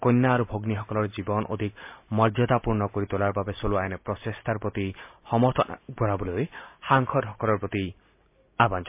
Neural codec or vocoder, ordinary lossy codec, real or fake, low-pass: none; MP3, 32 kbps; real; 3.6 kHz